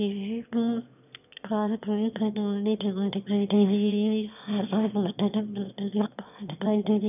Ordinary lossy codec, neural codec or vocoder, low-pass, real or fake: none; autoencoder, 22.05 kHz, a latent of 192 numbers a frame, VITS, trained on one speaker; 3.6 kHz; fake